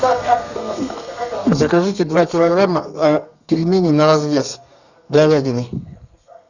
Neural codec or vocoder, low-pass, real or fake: codec, 32 kHz, 1.9 kbps, SNAC; 7.2 kHz; fake